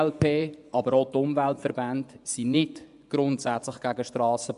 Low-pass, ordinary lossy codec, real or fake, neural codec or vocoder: 10.8 kHz; MP3, 96 kbps; fake; vocoder, 24 kHz, 100 mel bands, Vocos